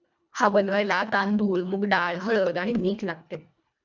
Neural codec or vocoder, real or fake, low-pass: codec, 24 kHz, 1.5 kbps, HILCodec; fake; 7.2 kHz